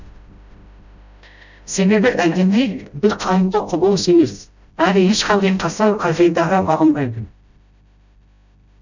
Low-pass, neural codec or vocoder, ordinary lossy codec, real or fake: 7.2 kHz; codec, 16 kHz, 0.5 kbps, FreqCodec, smaller model; none; fake